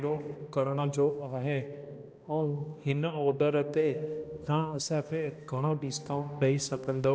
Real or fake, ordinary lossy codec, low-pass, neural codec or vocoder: fake; none; none; codec, 16 kHz, 1 kbps, X-Codec, HuBERT features, trained on balanced general audio